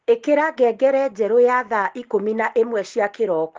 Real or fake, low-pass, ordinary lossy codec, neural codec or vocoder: real; 7.2 kHz; Opus, 16 kbps; none